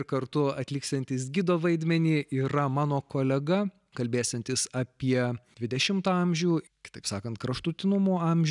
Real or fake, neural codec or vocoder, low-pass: real; none; 10.8 kHz